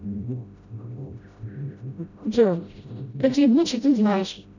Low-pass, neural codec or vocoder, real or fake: 7.2 kHz; codec, 16 kHz, 0.5 kbps, FreqCodec, smaller model; fake